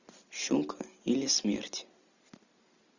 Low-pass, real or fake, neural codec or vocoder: 7.2 kHz; real; none